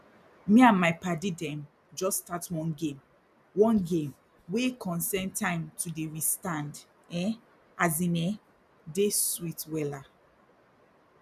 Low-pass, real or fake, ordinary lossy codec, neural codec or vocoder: 14.4 kHz; real; none; none